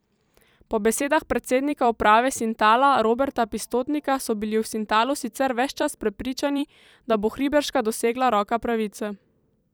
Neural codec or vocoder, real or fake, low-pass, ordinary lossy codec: vocoder, 44.1 kHz, 128 mel bands every 256 samples, BigVGAN v2; fake; none; none